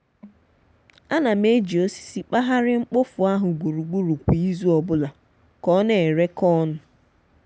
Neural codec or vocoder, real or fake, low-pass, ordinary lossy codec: none; real; none; none